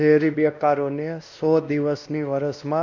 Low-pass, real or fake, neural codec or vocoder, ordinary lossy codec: 7.2 kHz; fake; codec, 16 kHz, 1 kbps, X-Codec, WavLM features, trained on Multilingual LibriSpeech; none